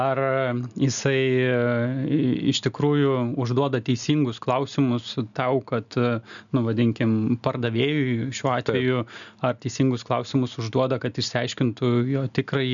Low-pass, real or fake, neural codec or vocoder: 7.2 kHz; real; none